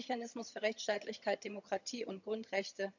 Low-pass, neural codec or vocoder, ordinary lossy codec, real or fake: 7.2 kHz; vocoder, 22.05 kHz, 80 mel bands, HiFi-GAN; none; fake